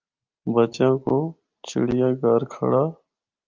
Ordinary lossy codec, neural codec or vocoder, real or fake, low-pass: Opus, 24 kbps; none; real; 7.2 kHz